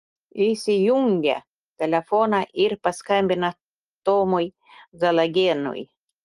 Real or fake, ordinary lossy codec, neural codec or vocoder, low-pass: real; Opus, 24 kbps; none; 10.8 kHz